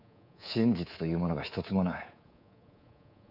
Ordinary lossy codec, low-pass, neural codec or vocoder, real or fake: none; 5.4 kHz; codec, 24 kHz, 3.1 kbps, DualCodec; fake